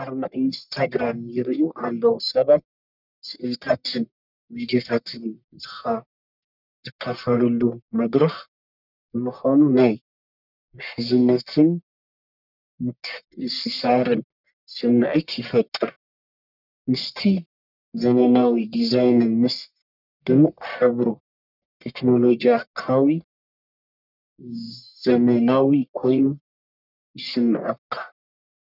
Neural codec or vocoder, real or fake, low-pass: codec, 44.1 kHz, 1.7 kbps, Pupu-Codec; fake; 5.4 kHz